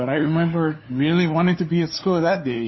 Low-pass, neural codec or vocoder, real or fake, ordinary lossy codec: 7.2 kHz; codec, 16 kHz, 4 kbps, FunCodec, trained on LibriTTS, 50 frames a second; fake; MP3, 24 kbps